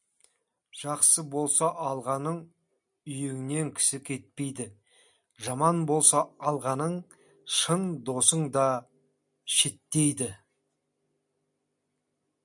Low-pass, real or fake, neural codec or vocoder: 10.8 kHz; real; none